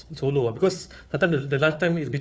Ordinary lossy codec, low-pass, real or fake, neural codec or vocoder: none; none; fake; codec, 16 kHz, 4 kbps, FunCodec, trained on Chinese and English, 50 frames a second